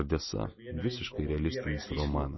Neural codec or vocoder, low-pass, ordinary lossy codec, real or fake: none; 7.2 kHz; MP3, 24 kbps; real